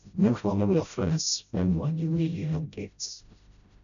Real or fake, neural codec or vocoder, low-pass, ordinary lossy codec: fake; codec, 16 kHz, 0.5 kbps, FreqCodec, smaller model; 7.2 kHz; none